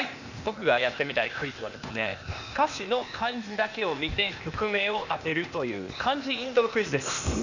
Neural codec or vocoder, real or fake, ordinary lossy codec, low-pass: codec, 16 kHz, 0.8 kbps, ZipCodec; fake; none; 7.2 kHz